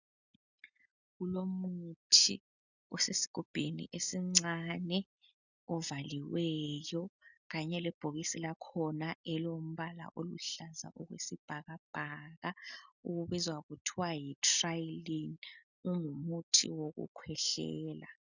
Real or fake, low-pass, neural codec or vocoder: real; 7.2 kHz; none